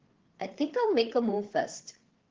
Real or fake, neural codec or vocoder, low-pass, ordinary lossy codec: fake; codec, 16 kHz, 4 kbps, FreqCodec, larger model; 7.2 kHz; Opus, 16 kbps